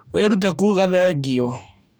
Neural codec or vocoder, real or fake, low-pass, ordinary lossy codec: codec, 44.1 kHz, 2.6 kbps, DAC; fake; none; none